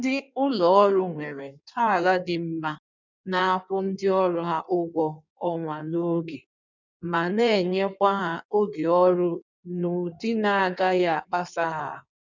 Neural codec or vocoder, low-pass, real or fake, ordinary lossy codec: codec, 16 kHz in and 24 kHz out, 1.1 kbps, FireRedTTS-2 codec; 7.2 kHz; fake; none